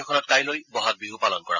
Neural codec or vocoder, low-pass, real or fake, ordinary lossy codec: none; 7.2 kHz; real; none